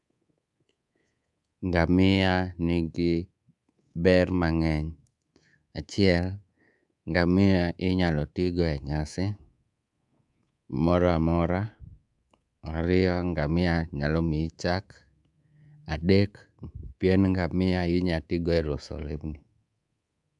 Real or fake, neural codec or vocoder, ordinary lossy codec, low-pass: fake; codec, 24 kHz, 3.1 kbps, DualCodec; none; 10.8 kHz